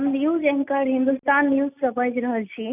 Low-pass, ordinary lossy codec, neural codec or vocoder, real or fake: 3.6 kHz; none; none; real